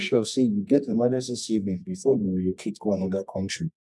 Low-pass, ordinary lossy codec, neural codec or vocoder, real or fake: none; none; codec, 24 kHz, 0.9 kbps, WavTokenizer, medium music audio release; fake